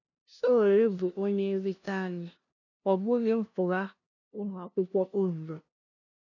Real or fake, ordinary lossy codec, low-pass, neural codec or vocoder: fake; none; 7.2 kHz; codec, 16 kHz, 0.5 kbps, FunCodec, trained on LibriTTS, 25 frames a second